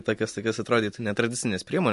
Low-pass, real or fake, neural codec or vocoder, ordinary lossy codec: 14.4 kHz; real; none; MP3, 48 kbps